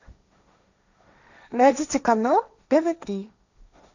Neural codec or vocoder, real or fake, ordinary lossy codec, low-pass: codec, 16 kHz, 1.1 kbps, Voila-Tokenizer; fake; none; 7.2 kHz